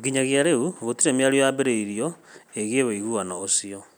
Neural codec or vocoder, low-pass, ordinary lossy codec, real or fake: none; none; none; real